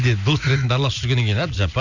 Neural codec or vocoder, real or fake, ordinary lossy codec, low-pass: none; real; none; 7.2 kHz